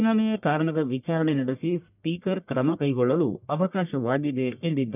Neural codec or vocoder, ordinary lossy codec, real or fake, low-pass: codec, 44.1 kHz, 1.7 kbps, Pupu-Codec; none; fake; 3.6 kHz